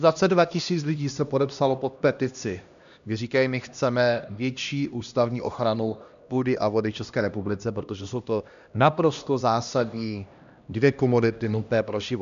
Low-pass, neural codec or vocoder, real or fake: 7.2 kHz; codec, 16 kHz, 1 kbps, X-Codec, HuBERT features, trained on LibriSpeech; fake